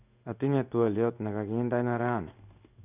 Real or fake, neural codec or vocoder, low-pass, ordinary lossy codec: fake; codec, 16 kHz in and 24 kHz out, 1 kbps, XY-Tokenizer; 3.6 kHz; none